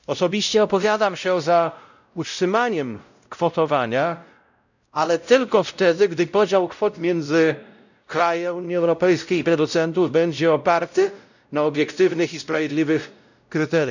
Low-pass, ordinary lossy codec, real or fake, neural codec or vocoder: 7.2 kHz; none; fake; codec, 16 kHz, 0.5 kbps, X-Codec, WavLM features, trained on Multilingual LibriSpeech